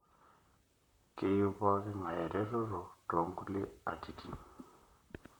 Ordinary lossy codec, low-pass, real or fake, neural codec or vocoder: none; 19.8 kHz; fake; vocoder, 44.1 kHz, 128 mel bands, Pupu-Vocoder